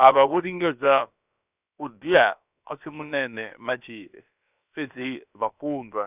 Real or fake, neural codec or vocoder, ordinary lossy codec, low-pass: fake; codec, 16 kHz, about 1 kbps, DyCAST, with the encoder's durations; none; 3.6 kHz